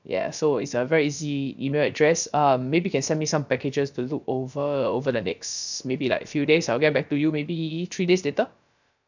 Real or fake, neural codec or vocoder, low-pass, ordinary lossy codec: fake; codec, 16 kHz, about 1 kbps, DyCAST, with the encoder's durations; 7.2 kHz; none